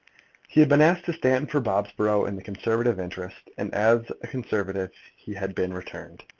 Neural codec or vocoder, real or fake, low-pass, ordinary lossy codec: none; real; 7.2 kHz; Opus, 32 kbps